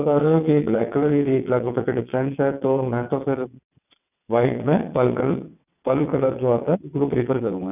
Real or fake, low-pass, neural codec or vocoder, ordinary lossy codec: fake; 3.6 kHz; vocoder, 22.05 kHz, 80 mel bands, WaveNeXt; none